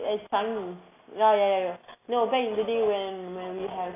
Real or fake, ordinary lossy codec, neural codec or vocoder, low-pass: real; AAC, 24 kbps; none; 3.6 kHz